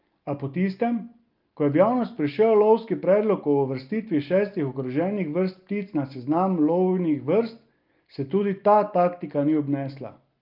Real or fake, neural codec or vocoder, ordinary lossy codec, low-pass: real; none; Opus, 32 kbps; 5.4 kHz